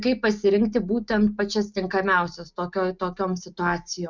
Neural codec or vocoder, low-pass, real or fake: none; 7.2 kHz; real